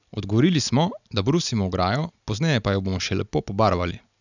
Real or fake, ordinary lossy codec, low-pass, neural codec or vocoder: real; none; 7.2 kHz; none